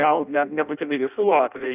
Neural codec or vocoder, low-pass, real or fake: codec, 16 kHz in and 24 kHz out, 0.6 kbps, FireRedTTS-2 codec; 3.6 kHz; fake